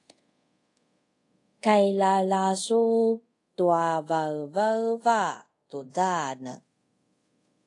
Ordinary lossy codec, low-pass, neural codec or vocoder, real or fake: AAC, 48 kbps; 10.8 kHz; codec, 24 kHz, 0.5 kbps, DualCodec; fake